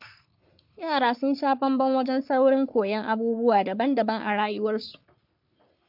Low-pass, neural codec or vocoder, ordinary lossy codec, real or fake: 5.4 kHz; codec, 44.1 kHz, 3.4 kbps, Pupu-Codec; MP3, 48 kbps; fake